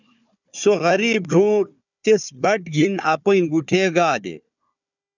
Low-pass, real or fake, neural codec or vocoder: 7.2 kHz; fake; codec, 16 kHz, 4 kbps, FunCodec, trained on Chinese and English, 50 frames a second